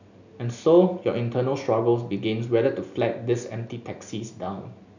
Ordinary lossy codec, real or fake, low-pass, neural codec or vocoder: none; real; 7.2 kHz; none